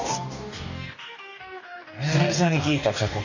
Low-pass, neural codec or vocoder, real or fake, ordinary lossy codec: 7.2 kHz; codec, 44.1 kHz, 2.6 kbps, DAC; fake; none